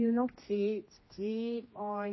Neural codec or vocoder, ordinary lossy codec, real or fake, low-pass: codec, 16 kHz, 1 kbps, X-Codec, HuBERT features, trained on general audio; MP3, 24 kbps; fake; 7.2 kHz